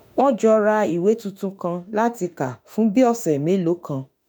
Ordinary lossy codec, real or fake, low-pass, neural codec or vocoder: none; fake; none; autoencoder, 48 kHz, 32 numbers a frame, DAC-VAE, trained on Japanese speech